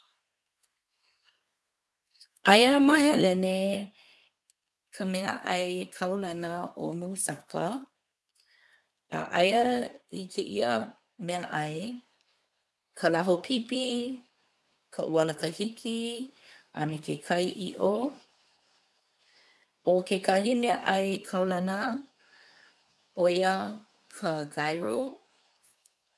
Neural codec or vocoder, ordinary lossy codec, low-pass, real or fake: codec, 24 kHz, 1 kbps, SNAC; none; none; fake